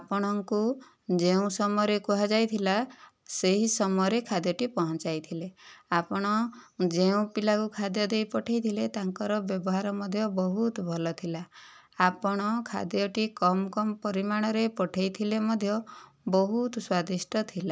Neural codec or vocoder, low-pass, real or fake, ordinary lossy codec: none; none; real; none